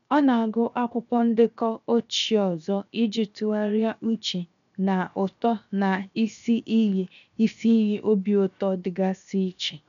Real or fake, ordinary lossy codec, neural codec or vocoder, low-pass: fake; none; codec, 16 kHz, 0.7 kbps, FocalCodec; 7.2 kHz